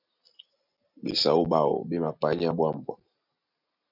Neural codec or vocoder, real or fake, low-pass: none; real; 5.4 kHz